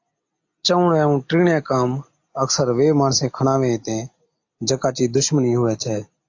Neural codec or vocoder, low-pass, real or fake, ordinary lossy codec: none; 7.2 kHz; real; AAC, 48 kbps